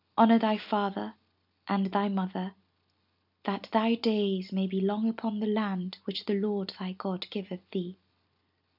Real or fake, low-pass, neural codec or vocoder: real; 5.4 kHz; none